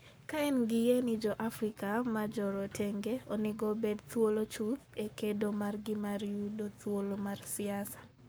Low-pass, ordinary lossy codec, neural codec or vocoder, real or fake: none; none; codec, 44.1 kHz, 7.8 kbps, Pupu-Codec; fake